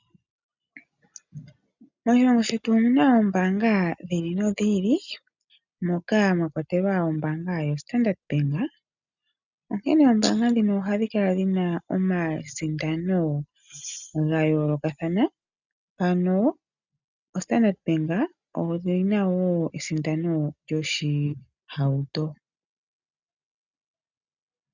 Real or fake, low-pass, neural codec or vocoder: real; 7.2 kHz; none